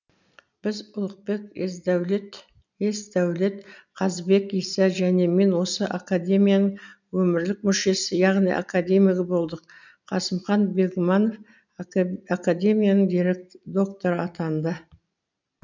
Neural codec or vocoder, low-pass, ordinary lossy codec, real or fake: none; 7.2 kHz; none; real